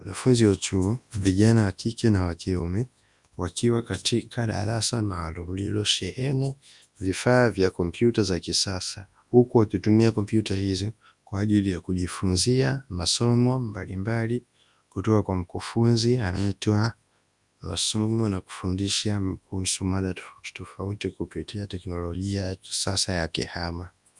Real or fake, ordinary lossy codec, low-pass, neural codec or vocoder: fake; Opus, 64 kbps; 10.8 kHz; codec, 24 kHz, 0.9 kbps, WavTokenizer, large speech release